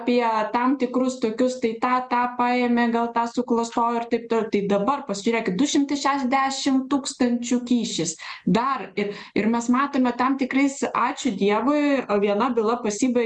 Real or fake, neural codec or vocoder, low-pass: real; none; 10.8 kHz